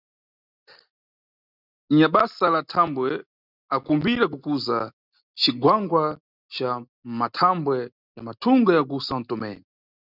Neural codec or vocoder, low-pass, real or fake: none; 5.4 kHz; real